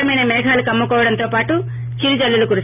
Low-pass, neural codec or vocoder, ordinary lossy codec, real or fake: 3.6 kHz; none; none; real